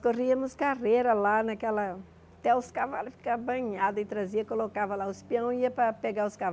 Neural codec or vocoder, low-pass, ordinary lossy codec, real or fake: none; none; none; real